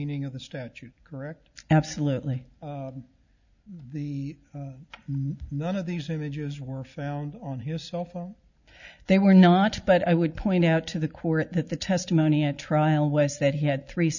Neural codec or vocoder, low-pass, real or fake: none; 7.2 kHz; real